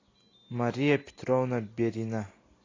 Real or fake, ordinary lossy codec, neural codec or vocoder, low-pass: real; AAC, 32 kbps; none; 7.2 kHz